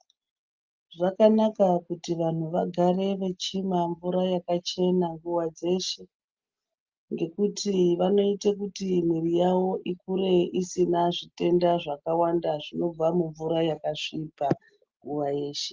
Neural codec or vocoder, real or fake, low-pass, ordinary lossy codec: none; real; 7.2 kHz; Opus, 32 kbps